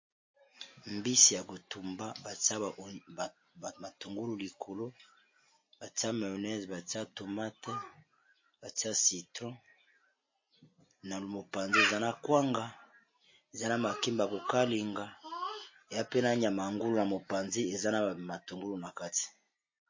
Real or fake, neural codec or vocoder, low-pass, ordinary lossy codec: real; none; 7.2 kHz; MP3, 32 kbps